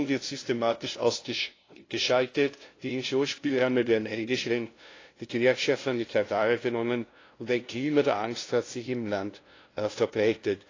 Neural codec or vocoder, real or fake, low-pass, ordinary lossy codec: codec, 16 kHz, 0.5 kbps, FunCodec, trained on LibriTTS, 25 frames a second; fake; 7.2 kHz; AAC, 32 kbps